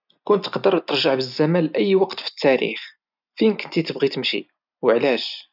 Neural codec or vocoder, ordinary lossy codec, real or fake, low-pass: none; none; real; 5.4 kHz